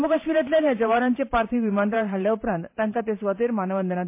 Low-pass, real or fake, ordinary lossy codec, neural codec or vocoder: 3.6 kHz; fake; MP3, 24 kbps; vocoder, 44.1 kHz, 128 mel bands every 512 samples, BigVGAN v2